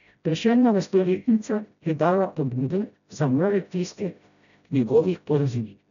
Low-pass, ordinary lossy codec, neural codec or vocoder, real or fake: 7.2 kHz; none; codec, 16 kHz, 0.5 kbps, FreqCodec, smaller model; fake